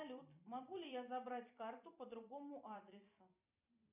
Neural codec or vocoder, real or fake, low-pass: vocoder, 44.1 kHz, 128 mel bands every 256 samples, BigVGAN v2; fake; 3.6 kHz